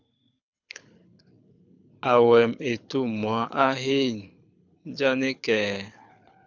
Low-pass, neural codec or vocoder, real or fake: 7.2 kHz; codec, 24 kHz, 6 kbps, HILCodec; fake